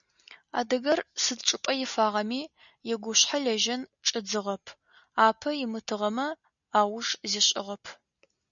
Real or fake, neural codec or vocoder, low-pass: real; none; 7.2 kHz